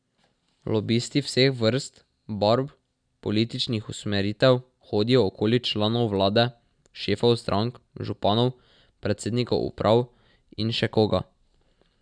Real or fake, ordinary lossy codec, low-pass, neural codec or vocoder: real; none; 9.9 kHz; none